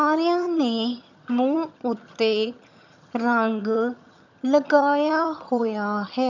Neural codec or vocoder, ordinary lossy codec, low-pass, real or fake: vocoder, 22.05 kHz, 80 mel bands, HiFi-GAN; none; 7.2 kHz; fake